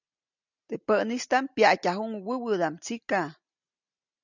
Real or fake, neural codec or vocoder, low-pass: real; none; 7.2 kHz